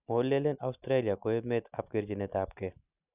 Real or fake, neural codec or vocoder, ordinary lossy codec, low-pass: real; none; none; 3.6 kHz